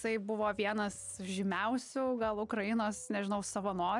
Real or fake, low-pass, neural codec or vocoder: real; 10.8 kHz; none